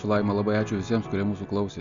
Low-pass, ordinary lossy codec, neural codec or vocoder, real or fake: 7.2 kHz; Opus, 64 kbps; none; real